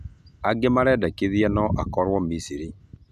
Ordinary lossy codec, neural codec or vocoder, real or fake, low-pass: none; none; real; 14.4 kHz